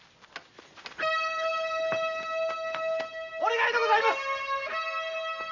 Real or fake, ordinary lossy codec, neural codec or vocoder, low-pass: real; AAC, 48 kbps; none; 7.2 kHz